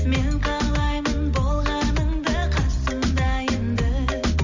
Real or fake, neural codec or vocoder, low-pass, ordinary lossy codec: real; none; 7.2 kHz; MP3, 64 kbps